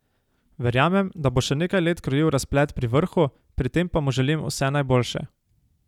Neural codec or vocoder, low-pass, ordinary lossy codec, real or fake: none; 19.8 kHz; none; real